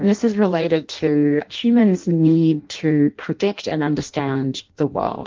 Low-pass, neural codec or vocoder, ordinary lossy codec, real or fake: 7.2 kHz; codec, 16 kHz in and 24 kHz out, 0.6 kbps, FireRedTTS-2 codec; Opus, 24 kbps; fake